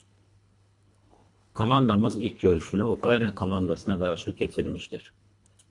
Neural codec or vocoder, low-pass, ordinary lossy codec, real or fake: codec, 24 kHz, 1.5 kbps, HILCodec; 10.8 kHz; MP3, 64 kbps; fake